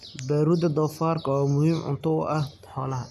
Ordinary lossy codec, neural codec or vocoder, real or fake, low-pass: none; none; real; 14.4 kHz